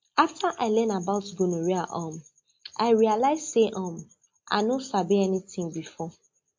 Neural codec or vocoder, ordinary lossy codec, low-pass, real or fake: none; MP3, 48 kbps; 7.2 kHz; real